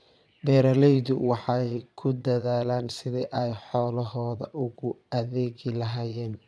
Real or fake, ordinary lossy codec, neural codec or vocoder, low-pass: fake; none; vocoder, 22.05 kHz, 80 mel bands, Vocos; none